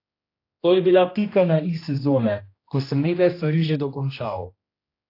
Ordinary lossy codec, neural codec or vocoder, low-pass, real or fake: AAC, 32 kbps; codec, 16 kHz, 1 kbps, X-Codec, HuBERT features, trained on general audio; 5.4 kHz; fake